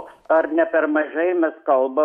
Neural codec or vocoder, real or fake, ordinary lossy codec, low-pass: autoencoder, 48 kHz, 128 numbers a frame, DAC-VAE, trained on Japanese speech; fake; MP3, 64 kbps; 19.8 kHz